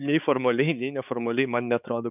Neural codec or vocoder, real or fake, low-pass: codec, 16 kHz, 4 kbps, X-Codec, HuBERT features, trained on balanced general audio; fake; 3.6 kHz